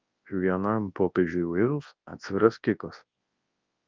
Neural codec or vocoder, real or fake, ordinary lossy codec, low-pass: codec, 24 kHz, 0.9 kbps, WavTokenizer, large speech release; fake; Opus, 32 kbps; 7.2 kHz